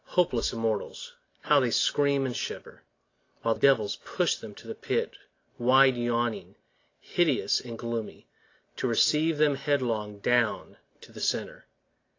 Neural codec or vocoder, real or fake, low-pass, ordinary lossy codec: none; real; 7.2 kHz; AAC, 32 kbps